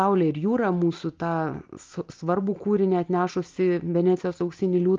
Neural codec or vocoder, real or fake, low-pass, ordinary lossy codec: none; real; 7.2 kHz; Opus, 32 kbps